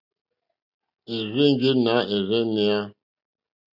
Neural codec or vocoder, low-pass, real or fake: none; 5.4 kHz; real